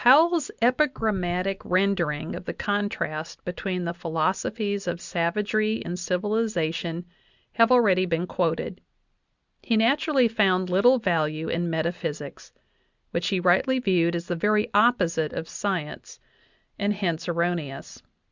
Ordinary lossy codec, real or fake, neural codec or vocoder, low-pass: Opus, 64 kbps; real; none; 7.2 kHz